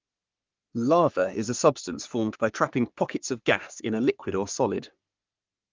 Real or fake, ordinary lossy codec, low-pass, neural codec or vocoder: fake; Opus, 32 kbps; 7.2 kHz; codec, 44.1 kHz, 3.4 kbps, Pupu-Codec